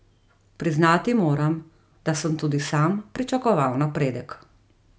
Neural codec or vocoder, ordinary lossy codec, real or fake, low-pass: none; none; real; none